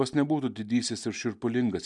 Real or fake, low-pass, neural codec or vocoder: real; 10.8 kHz; none